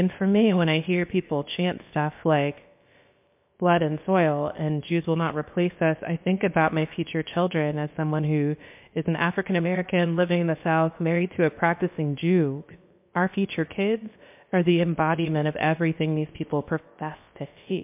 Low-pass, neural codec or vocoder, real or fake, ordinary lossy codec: 3.6 kHz; codec, 16 kHz, about 1 kbps, DyCAST, with the encoder's durations; fake; MP3, 32 kbps